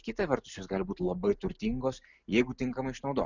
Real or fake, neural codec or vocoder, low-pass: real; none; 7.2 kHz